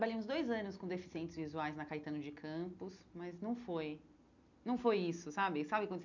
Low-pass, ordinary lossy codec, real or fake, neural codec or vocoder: 7.2 kHz; none; real; none